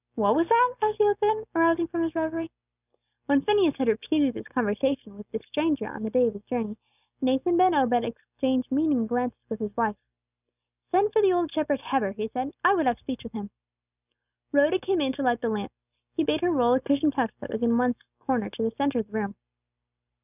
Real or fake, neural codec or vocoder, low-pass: real; none; 3.6 kHz